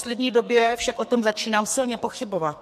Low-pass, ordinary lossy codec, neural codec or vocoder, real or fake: 14.4 kHz; MP3, 64 kbps; codec, 44.1 kHz, 2.6 kbps, SNAC; fake